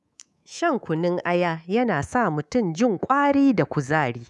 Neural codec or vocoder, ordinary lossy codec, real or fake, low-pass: codec, 24 kHz, 3.1 kbps, DualCodec; none; fake; none